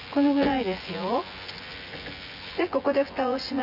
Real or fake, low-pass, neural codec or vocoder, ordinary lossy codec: fake; 5.4 kHz; vocoder, 24 kHz, 100 mel bands, Vocos; none